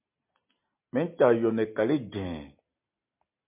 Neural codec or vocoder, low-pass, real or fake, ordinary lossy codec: none; 3.6 kHz; real; MP3, 32 kbps